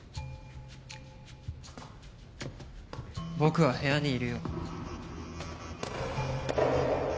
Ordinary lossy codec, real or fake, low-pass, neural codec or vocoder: none; real; none; none